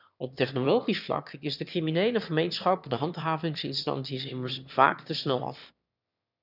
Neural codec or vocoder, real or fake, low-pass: autoencoder, 22.05 kHz, a latent of 192 numbers a frame, VITS, trained on one speaker; fake; 5.4 kHz